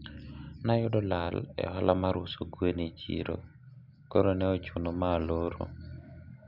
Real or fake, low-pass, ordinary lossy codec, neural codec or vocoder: real; 5.4 kHz; none; none